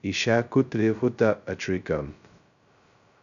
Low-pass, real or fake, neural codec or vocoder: 7.2 kHz; fake; codec, 16 kHz, 0.2 kbps, FocalCodec